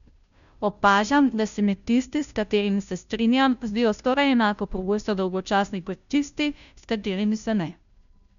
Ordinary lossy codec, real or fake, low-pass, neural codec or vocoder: none; fake; 7.2 kHz; codec, 16 kHz, 0.5 kbps, FunCodec, trained on Chinese and English, 25 frames a second